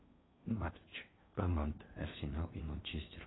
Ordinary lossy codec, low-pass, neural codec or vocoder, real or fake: AAC, 16 kbps; 7.2 kHz; codec, 16 kHz in and 24 kHz out, 0.6 kbps, FocalCodec, streaming, 2048 codes; fake